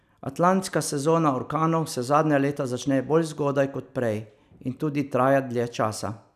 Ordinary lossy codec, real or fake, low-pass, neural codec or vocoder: none; real; 14.4 kHz; none